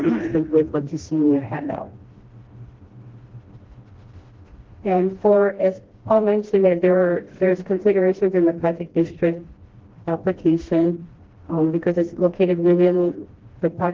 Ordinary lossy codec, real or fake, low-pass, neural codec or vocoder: Opus, 16 kbps; fake; 7.2 kHz; codec, 16 kHz, 1 kbps, FreqCodec, smaller model